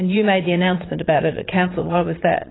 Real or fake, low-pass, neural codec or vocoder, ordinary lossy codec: fake; 7.2 kHz; vocoder, 22.05 kHz, 80 mel bands, Vocos; AAC, 16 kbps